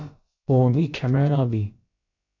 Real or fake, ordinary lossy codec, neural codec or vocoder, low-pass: fake; AAC, 48 kbps; codec, 16 kHz, about 1 kbps, DyCAST, with the encoder's durations; 7.2 kHz